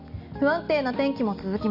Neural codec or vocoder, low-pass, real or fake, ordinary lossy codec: none; 5.4 kHz; real; none